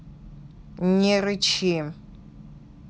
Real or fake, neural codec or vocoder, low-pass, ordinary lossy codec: real; none; none; none